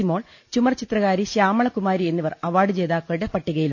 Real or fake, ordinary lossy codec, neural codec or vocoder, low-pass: real; none; none; 7.2 kHz